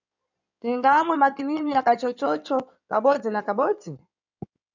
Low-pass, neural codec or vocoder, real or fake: 7.2 kHz; codec, 16 kHz in and 24 kHz out, 2.2 kbps, FireRedTTS-2 codec; fake